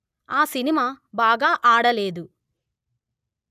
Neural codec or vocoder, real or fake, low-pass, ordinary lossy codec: none; real; 14.4 kHz; none